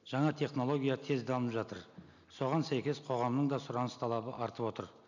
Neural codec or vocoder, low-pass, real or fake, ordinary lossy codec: none; 7.2 kHz; real; none